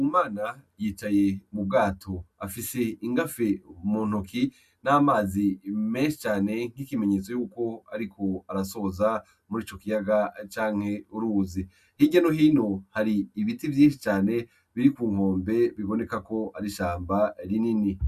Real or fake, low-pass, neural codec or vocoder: real; 14.4 kHz; none